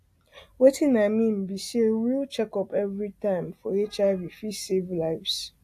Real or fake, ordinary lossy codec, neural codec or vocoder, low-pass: real; AAC, 64 kbps; none; 14.4 kHz